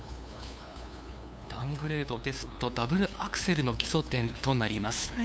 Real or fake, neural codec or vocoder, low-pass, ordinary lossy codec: fake; codec, 16 kHz, 2 kbps, FunCodec, trained on LibriTTS, 25 frames a second; none; none